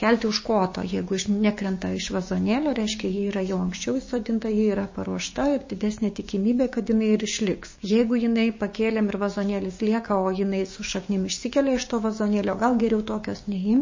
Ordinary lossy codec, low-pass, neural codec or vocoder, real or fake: MP3, 32 kbps; 7.2 kHz; codec, 16 kHz, 6 kbps, DAC; fake